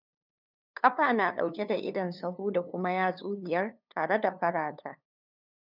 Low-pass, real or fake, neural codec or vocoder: 5.4 kHz; fake; codec, 16 kHz, 2 kbps, FunCodec, trained on LibriTTS, 25 frames a second